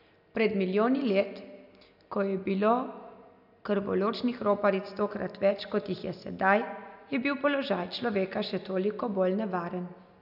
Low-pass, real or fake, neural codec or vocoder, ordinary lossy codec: 5.4 kHz; real; none; none